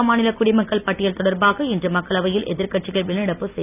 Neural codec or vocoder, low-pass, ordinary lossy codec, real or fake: none; 3.6 kHz; none; real